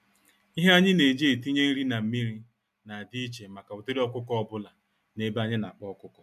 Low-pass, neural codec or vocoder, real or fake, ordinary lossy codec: 14.4 kHz; none; real; MP3, 96 kbps